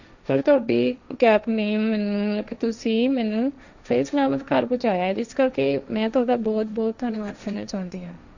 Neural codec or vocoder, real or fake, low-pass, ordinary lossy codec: codec, 16 kHz, 1.1 kbps, Voila-Tokenizer; fake; 7.2 kHz; none